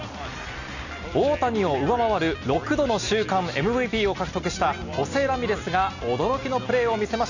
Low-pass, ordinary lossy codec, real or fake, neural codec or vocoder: 7.2 kHz; none; real; none